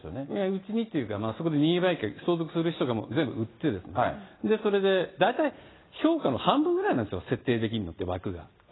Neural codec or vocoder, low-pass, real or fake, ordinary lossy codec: none; 7.2 kHz; real; AAC, 16 kbps